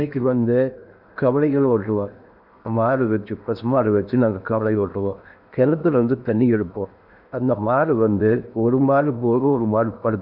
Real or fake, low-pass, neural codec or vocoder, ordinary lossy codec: fake; 5.4 kHz; codec, 16 kHz in and 24 kHz out, 0.8 kbps, FocalCodec, streaming, 65536 codes; none